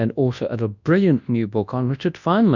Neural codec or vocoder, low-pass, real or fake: codec, 24 kHz, 0.9 kbps, WavTokenizer, large speech release; 7.2 kHz; fake